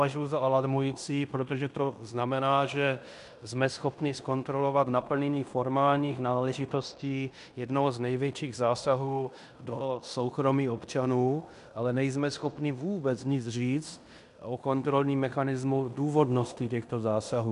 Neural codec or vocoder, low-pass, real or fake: codec, 16 kHz in and 24 kHz out, 0.9 kbps, LongCat-Audio-Codec, fine tuned four codebook decoder; 10.8 kHz; fake